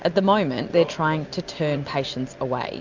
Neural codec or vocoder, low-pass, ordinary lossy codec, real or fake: none; 7.2 kHz; MP3, 64 kbps; real